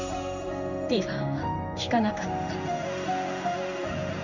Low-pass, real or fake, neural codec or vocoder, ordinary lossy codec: 7.2 kHz; fake; codec, 16 kHz in and 24 kHz out, 1 kbps, XY-Tokenizer; none